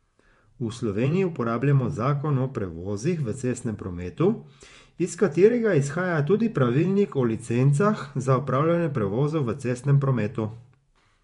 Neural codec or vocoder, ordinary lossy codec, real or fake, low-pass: vocoder, 24 kHz, 100 mel bands, Vocos; MP3, 64 kbps; fake; 10.8 kHz